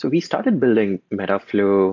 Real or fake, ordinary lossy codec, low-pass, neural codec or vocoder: real; AAC, 48 kbps; 7.2 kHz; none